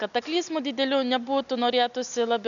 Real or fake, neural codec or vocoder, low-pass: real; none; 7.2 kHz